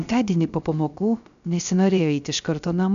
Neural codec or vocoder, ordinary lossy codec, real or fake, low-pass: codec, 16 kHz, 0.3 kbps, FocalCodec; MP3, 96 kbps; fake; 7.2 kHz